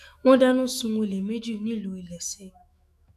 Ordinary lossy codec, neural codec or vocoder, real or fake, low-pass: none; autoencoder, 48 kHz, 128 numbers a frame, DAC-VAE, trained on Japanese speech; fake; 14.4 kHz